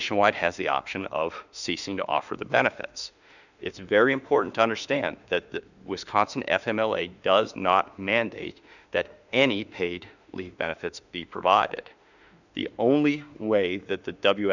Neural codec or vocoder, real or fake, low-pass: autoencoder, 48 kHz, 32 numbers a frame, DAC-VAE, trained on Japanese speech; fake; 7.2 kHz